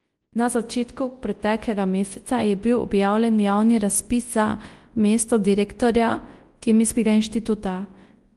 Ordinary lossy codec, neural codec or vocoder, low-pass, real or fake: Opus, 24 kbps; codec, 24 kHz, 0.9 kbps, WavTokenizer, large speech release; 10.8 kHz; fake